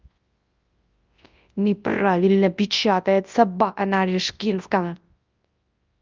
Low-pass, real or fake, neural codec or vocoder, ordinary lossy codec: 7.2 kHz; fake; codec, 24 kHz, 0.9 kbps, WavTokenizer, large speech release; Opus, 24 kbps